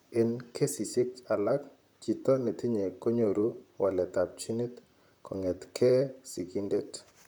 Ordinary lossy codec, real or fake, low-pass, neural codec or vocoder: none; fake; none; vocoder, 44.1 kHz, 128 mel bands, Pupu-Vocoder